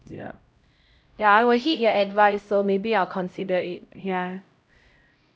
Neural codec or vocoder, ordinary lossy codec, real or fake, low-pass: codec, 16 kHz, 0.5 kbps, X-Codec, HuBERT features, trained on LibriSpeech; none; fake; none